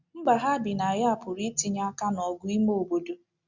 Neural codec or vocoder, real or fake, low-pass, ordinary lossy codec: none; real; 7.2 kHz; Opus, 64 kbps